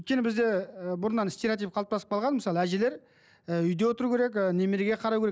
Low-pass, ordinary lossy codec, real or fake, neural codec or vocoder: none; none; real; none